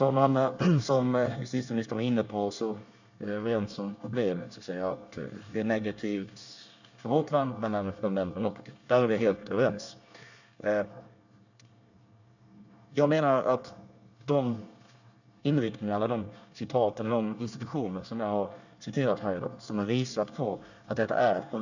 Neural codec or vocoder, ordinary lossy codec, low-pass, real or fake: codec, 24 kHz, 1 kbps, SNAC; none; 7.2 kHz; fake